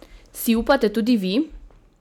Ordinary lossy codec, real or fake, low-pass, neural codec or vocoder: none; real; 19.8 kHz; none